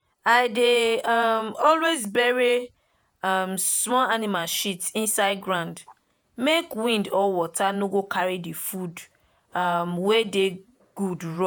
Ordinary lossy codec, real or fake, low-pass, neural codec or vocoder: none; fake; none; vocoder, 48 kHz, 128 mel bands, Vocos